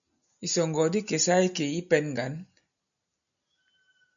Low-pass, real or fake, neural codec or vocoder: 7.2 kHz; real; none